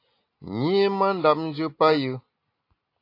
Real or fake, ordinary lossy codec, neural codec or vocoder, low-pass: real; AAC, 32 kbps; none; 5.4 kHz